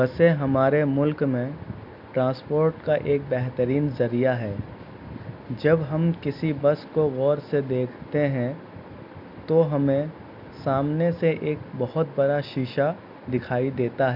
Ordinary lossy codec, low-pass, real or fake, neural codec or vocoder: none; 5.4 kHz; real; none